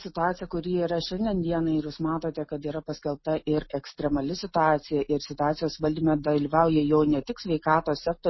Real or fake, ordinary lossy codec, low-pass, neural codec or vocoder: real; MP3, 24 kbps; 7.2 kHz; none